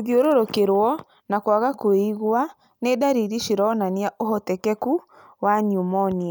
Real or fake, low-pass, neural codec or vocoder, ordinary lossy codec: real; none; none; none